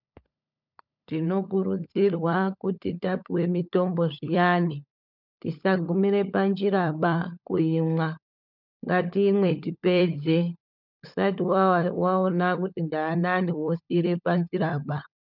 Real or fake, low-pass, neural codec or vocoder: fake; 5.4 kHz; codec, 16 kHz, 16 kbps, FunCodec, trained on LibriTTS, 50 frames a second